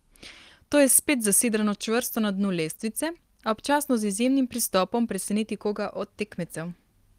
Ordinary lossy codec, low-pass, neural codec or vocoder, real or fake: Opus, 24 kbps; 14.4 kHz; none; real